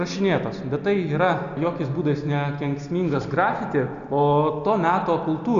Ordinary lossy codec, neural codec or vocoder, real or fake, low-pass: AAC, 96 kbps; none; real; 7.2 kHz